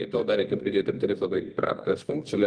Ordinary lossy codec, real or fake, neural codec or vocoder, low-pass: Opus, 24 kbps; fake; codec, 24 kHz, 0.9 kbps, WavTokenizer, medium music audio release; 9.9 kHz